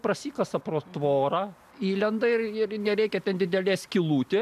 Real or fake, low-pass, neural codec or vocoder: real; 14.4 kHz; none